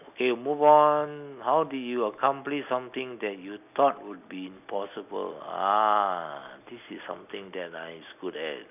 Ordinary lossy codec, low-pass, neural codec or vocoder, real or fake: none; 3.6 kHz; none; real